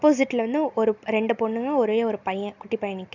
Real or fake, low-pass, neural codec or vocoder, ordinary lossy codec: real; 7.2 kHz; none; none